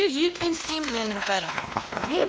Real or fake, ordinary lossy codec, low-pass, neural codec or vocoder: fake; none; none; codec, 16 kHz, 1 kbps, X-Codec, WavLM features, trained on Multilingual LibriSpeech